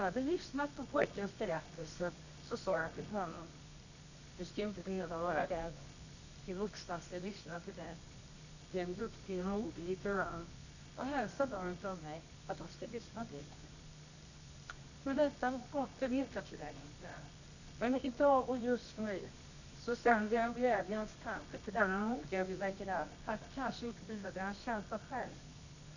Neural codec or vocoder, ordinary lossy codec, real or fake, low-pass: codec, 24 kHz, 0.9 kbps, WavTokenizer, medium music audio release; none; fake; 7.2 kHz